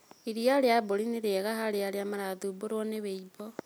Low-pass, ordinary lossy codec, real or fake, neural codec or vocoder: none; none; real; none